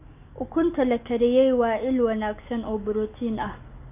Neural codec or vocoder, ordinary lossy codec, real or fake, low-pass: none; none; real; 3.6 kHz